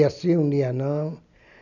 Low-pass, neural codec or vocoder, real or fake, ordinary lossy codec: 7.2 kHz; none; real; none